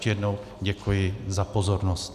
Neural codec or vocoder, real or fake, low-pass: none; real; 14.4 kHz